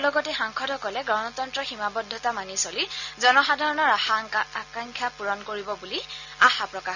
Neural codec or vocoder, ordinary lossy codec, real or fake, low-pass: none; none; real; 7.2 kHz